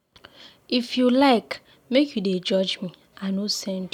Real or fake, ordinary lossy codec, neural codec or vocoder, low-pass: real; none; none; 19.8 kHz